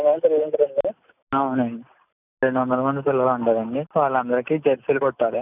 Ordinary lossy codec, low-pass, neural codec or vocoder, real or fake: none; 3.6 kHz; none; real